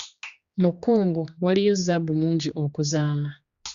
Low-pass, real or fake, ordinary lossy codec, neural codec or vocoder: 7.2 kHz; fake; Opus, 64 kbps; codec, 16 kHz, 2 kbps, X-Codec, HuBERT features, trained on general audio